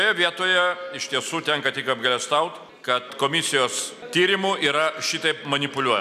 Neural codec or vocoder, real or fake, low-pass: none; real; 14.4 kHz